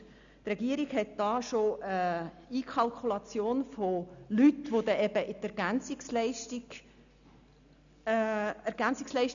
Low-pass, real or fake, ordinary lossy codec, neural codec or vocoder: 7.2 kHz; real; none; none